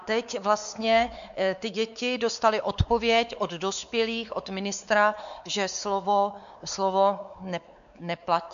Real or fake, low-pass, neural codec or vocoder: fake; 7.2 kHz; codec, 16 kHz, 4 kbps, X-Codec, WavLM features, trained on Multilingual LibriSpeech